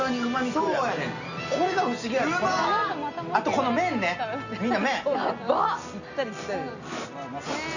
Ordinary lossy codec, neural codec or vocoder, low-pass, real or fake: none; none; 7.2 kHz; real